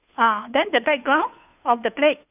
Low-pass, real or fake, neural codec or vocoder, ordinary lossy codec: 3.6 kHz; fake; codec, 16 kHz, 2 kbps, FunCodec, trained on Chinese and English, 25 frames a second; none